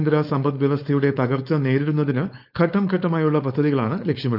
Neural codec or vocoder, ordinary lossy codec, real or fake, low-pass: codec, 16 kHz, 4.8 kbps, FACodec; none; fake; 5.4 kHz